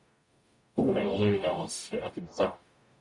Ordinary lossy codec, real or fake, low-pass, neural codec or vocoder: MP3, 96 kbps; fake; 10.8 kHz; codec, 44.1 kHz, 0.9 kbps, DAC